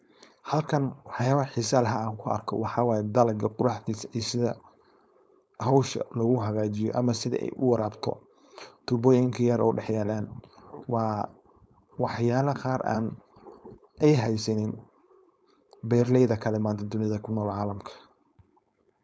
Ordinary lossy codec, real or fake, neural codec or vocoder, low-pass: none; fake; codec, 16 kHz, 4.8 kbps, FACodec; none